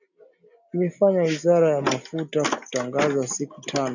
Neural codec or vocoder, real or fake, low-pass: none; real; 7.2 kHz